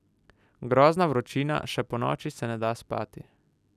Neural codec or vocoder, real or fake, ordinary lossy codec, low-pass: none; real; none; 14.4 kHz